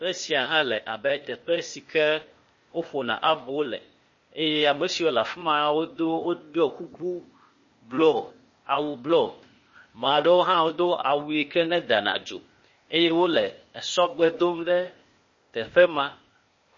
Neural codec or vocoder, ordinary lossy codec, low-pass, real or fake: codec, 16 kHz, 0.8 kbps, ZipCodec; MP3, 32 kbps; 7.2 kHz; fake